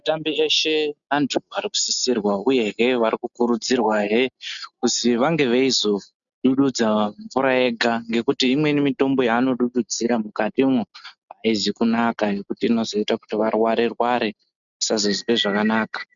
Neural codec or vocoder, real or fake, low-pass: none; real; 7.2 kHz